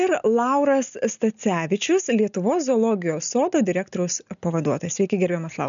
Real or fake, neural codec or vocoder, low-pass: real; none; 7.2 kHz